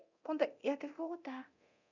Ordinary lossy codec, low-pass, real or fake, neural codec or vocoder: none; 7.2 kHz; fake; codec, 24 kHz, 0.9 kbps, DualCodec